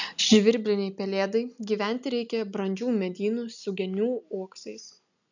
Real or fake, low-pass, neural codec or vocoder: real; 7.2 kHz; none